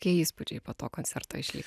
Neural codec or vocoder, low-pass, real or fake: none; 14.4 kHz; real